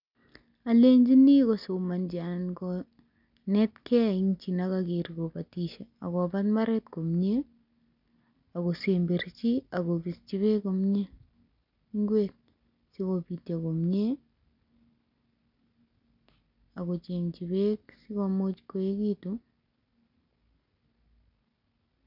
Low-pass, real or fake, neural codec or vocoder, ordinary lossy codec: 5.4 kHz; real; none; none